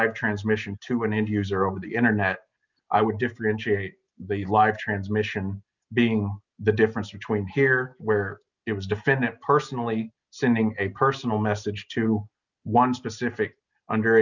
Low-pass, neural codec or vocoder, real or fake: 7.2 kHz; none; real